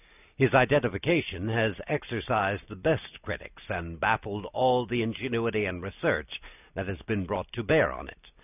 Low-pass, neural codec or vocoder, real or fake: 3.6 kHz; none; real